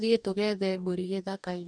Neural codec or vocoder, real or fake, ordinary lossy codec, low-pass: codec, 44.1 kHz, 2.6 kbps, DAC; fake; none; 9.9 kHz